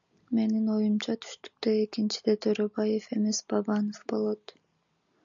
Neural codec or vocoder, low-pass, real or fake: none; 7.2 kHz; real